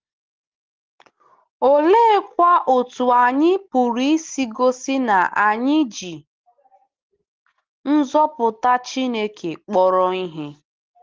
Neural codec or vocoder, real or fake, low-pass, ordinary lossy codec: none; real; 7.2 kHz; Opus, 16 kbps